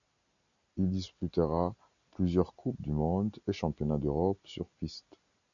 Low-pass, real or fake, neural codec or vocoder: 7.2 kHz; real; none